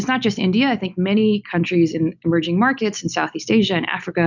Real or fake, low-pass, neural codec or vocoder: real; 7.2 kHz; none